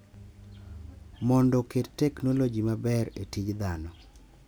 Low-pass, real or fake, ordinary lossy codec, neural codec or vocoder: none; real; none; none